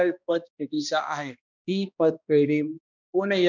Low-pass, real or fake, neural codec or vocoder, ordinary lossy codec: 7.2 kHz; fake; codec, 16 kHz, 1 kbps, X-Codec, HuBERT features, trained on balanced general audio; none